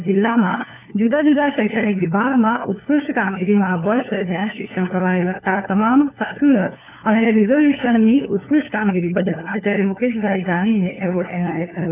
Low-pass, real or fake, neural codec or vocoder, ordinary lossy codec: 3.6 kHz; fake; codec, 16 kHz, 4 kbps, FunCodec, trained on LibriTTS, 50 frames a second; none